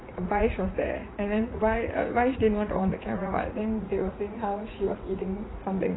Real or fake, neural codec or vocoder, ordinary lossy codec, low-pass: fake; vocoder, 44.1 kHz, 80 mel bands, Vocos; AAC, 16 kbps; 7.2 kHz